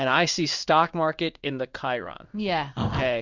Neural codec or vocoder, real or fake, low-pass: codec, 16 kHz in and 24 kHz out, 1 kbps, XY-Tokenizer; fake; 7.2 kHz